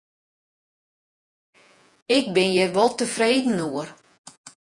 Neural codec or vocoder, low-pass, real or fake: vocoder, 48 kHz, 128 mel bands, Vocos; 10.8 kHz; fake